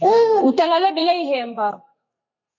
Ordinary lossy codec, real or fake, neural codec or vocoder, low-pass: MP3, 64 kbps; fake; codec, 32 kHz, 1.9 kbps, SNAC; 7.2 kHz